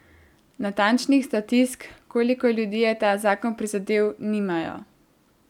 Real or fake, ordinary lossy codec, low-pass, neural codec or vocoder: real; none; 19.8 kHz; none